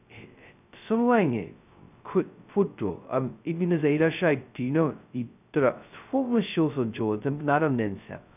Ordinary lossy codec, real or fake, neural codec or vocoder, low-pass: none; fake; codec, 16 kHz, 0.2 kbps, FocalCodec; 3.6 kHz